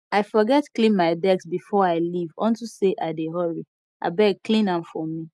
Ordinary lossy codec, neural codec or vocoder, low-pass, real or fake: none; none; none; real